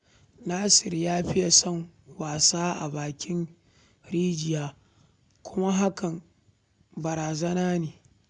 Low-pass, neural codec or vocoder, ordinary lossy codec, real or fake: 9.9 kHz; none; none; real